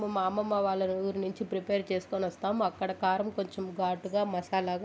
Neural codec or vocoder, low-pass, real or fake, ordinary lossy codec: none; none; real; none